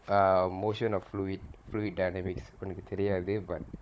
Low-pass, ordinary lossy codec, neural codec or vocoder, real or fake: none; none; codec, 16 kHz, 16 kbps, FunCodec, trained on Chinese and English, 50 frames a second; fake